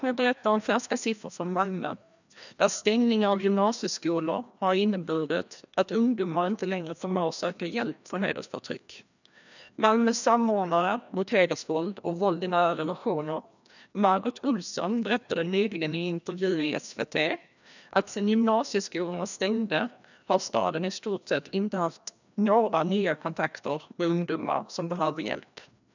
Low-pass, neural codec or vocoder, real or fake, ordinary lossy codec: 7.2 kHz; codec, 16 kHz, 1 kbps, FreqCodec, larger model; fake; none